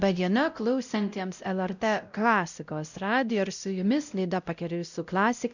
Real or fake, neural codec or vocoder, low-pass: fake; codec, 16 kHz, 0.5 kbps, X-Codec, WavLM features, trained on Multilingual LibriSpeech; 7.2 kHz